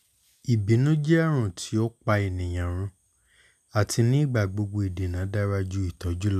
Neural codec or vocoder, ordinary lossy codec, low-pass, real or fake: none; none; 14.4 kHz; real